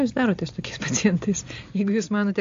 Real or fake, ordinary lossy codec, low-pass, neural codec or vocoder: real; AAC, 48 kbps; 7.2 kHz; none